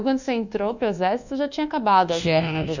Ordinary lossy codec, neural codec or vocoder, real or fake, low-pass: none; codec, 24 kHz, 1.2 kbps, DualCodec; fake; 7.2 kHz